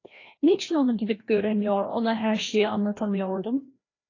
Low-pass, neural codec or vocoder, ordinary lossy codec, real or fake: 7.2 kHz; codec, 16 kHz, 1 kbps, FreqCodec, larger model; AAC, 32 kbps; fake